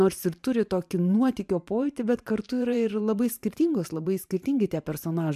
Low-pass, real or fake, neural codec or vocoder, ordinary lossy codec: 14.4 kHz; fake; vocoder, 44.1 kHz, 128 mel bands every 512 samples, BigVGAN v2; MP3, 96 kbps